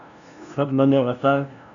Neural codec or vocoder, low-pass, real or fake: codec, 16 kHz, 0.5 kbps, FunCodec, trained on LibriTTS, 25 frames a second; 7.2 kHz; fake